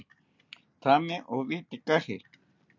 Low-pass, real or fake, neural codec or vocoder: 7.2 kHz; real; none